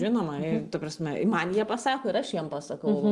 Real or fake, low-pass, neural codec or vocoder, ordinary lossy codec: real; 10.8 kHz; none; Opus, 32 kbps